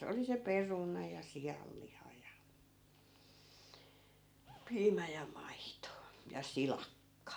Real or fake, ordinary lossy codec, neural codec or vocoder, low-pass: real; none; none; none